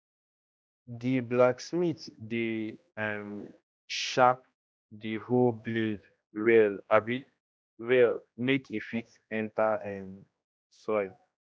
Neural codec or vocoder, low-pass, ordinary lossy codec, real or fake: codec, 16 kHz, 1 kbps, X-Codec, HuBERT features, trained on general audio; none; none; fake